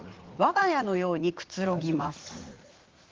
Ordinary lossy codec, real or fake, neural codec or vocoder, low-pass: Opus, 16 kbps; fake; vocoder, 44.1 kHz, 80 mel bands, Vocos; 7.2 kHz